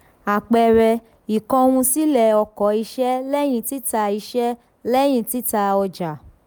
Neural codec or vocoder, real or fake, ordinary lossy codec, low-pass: none; real; none; none